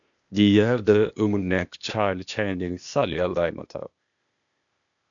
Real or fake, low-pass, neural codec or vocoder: fake; 7.2 kHz; codec, 16 kHz, 0.8 kbps, ZipCodec